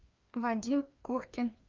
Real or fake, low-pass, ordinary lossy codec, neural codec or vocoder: fake; 7.2 kHz; Opus, 32 kbps; codec, 16 kHz, 2 kbps, FreqCodec, larger model